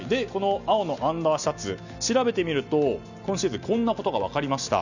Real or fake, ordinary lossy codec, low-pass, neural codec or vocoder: real; none; 7.2 kHz; none